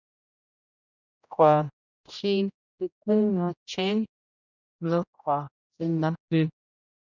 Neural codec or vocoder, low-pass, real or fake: codec, 16 kHz, 0.5 kbps, X-Codec, HuBERT features, trained on general audio; 7.2 kHz; fake